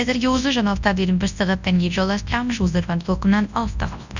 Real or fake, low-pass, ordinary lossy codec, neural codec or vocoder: fake; 7.2 kHz; none; codec, 24 kHz, 0.9 kbps, WavTokenizer, large speech release